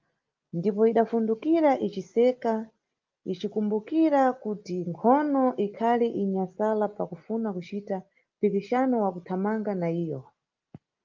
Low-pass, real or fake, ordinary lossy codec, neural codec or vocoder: 7.2 kHz; real; Opus, 24 kbps; none